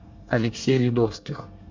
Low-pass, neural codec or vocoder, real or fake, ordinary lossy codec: 7.2 kHz; codec, 24 kHz, 1 kbps, SNAC; fake; MP3, 48 kbps